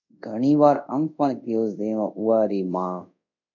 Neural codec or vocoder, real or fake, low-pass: codec, 24 kHz, 0.5 kbps, DualCodec; fake; 7.2 kHz